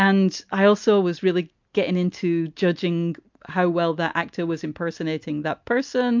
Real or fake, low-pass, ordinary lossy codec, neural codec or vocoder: real; 7.2 kHz; MP3, 64 kbps; none